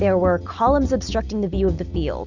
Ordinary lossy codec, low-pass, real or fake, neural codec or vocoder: Opus, 64 kbps; 7.2 kHz; real; none